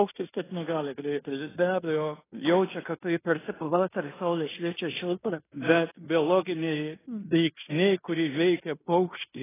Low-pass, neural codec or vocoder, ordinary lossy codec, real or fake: 3.6 kHz; codec, 16 kHz in and 24 kHz out, 0.9 kbps, LongCat-Audio-Codec, fine tuned four codebook decoder; AAC, 16 kbps; fake